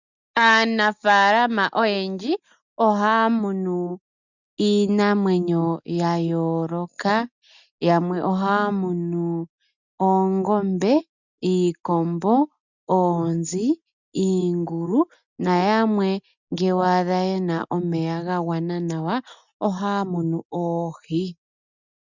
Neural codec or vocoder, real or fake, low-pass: none; real; 7.2 kHz